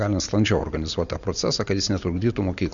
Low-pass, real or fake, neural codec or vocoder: 7.2 kHz; real; none